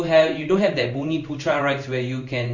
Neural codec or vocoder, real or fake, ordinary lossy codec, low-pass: codec, 16 kHz in and 24 kHz out, 1 kbps, XY-Tokenizer; fake; none; 7.2 kHz